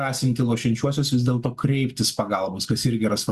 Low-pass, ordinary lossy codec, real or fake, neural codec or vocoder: 14.4 kHz; Opus, 64 kbps; real; none